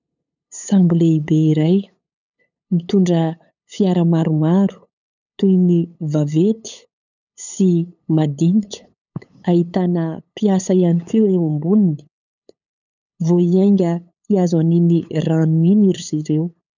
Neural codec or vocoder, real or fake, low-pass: codec, 16 kHz, 8 kbps, FunCodec, trained on LibriTTS, 25 frames a second; fake; 7.2 kHz